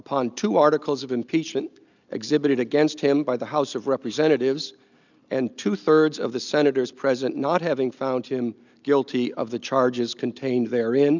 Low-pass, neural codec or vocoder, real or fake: 7.2 kHz; none; real